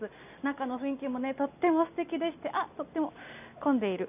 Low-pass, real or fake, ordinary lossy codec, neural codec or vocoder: 3.6 kHz; real; none; none